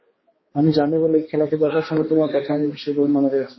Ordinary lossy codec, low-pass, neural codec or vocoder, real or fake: MP3, 24 kbps; 7.2 kHz; codec, 16 kHz, 2 kbps, X-Codec, HuBERT features, trained on balanced general audio; fake